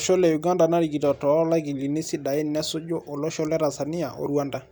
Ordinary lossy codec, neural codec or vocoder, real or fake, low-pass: none; none; real; none